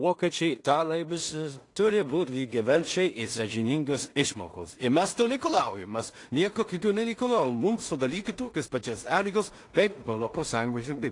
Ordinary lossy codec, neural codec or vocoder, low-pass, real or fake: AAC, 48 kbps; codec, 16 kHz in and 24 kHz out, 0.4 kbps, LongCat-Audio-Codec, two codebook decoder; 10.8 kHz; fake